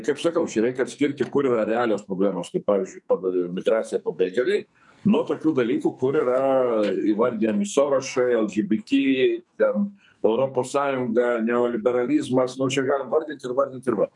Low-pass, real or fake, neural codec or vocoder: 10.8 kHz; fake; codec, 44.1 kHz, 2.6 kbps, SNAC